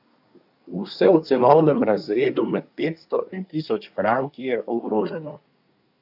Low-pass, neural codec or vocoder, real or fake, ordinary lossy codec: 5.4 kHz; codec, 24 kHz, 1 kbps, SNAC; fake; none